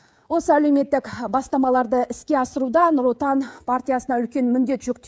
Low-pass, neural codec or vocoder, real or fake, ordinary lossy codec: none; codec, 16 kHz, 16 kbps, FreqCodec, smaller model; fake; none